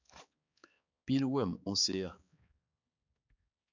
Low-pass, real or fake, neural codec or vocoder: 7.2 kHz; fake; codec, 16 kHz, 4 kbps, X-Codec, HuBERT features, trained on balanced general audio